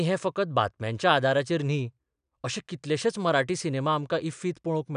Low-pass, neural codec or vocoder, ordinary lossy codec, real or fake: 9.9 kHz; none; none; real